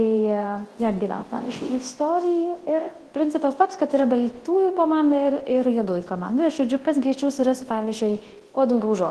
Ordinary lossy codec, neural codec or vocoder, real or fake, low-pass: Opus, 16 kbps; codec, 24 kHz, 0.9 kbps, WavTokenizer, large speech release; fake; 10.8 kHz